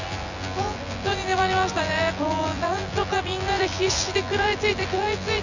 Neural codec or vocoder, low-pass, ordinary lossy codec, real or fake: vocoder, 24 kHz, 100 mel bands, Vocos; 7.2 kHz; none; fake